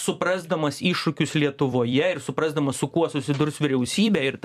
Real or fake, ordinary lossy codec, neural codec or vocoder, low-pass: fake; MP3, 96 kbps; vocoder, 44.1 kHz, 128 mel bands every 256 samples, BigVGAN v2; 14.4 kHz